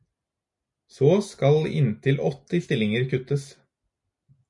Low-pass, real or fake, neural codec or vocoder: 10.8 kHz; real; none